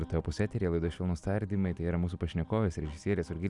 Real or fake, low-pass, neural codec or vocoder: real; 10.8 kHz; none